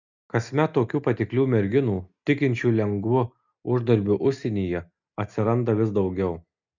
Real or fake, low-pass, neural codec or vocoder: real; 7.2 kHz; none